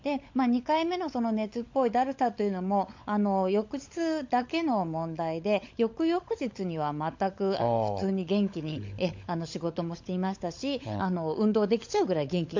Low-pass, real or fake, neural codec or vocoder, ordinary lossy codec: 7.2 kHz; fake; codec, 16 kHz, 16 kbps, FunCodec, trained on LibriTTS, 50 frames a second; MP3, 48 kbps